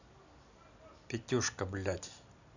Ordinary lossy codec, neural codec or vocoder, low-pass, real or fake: none; none; 7.2 kHz; real